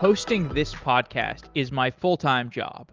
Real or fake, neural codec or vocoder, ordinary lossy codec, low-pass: real; none; Opus, 24 kbps; 7.2 kHz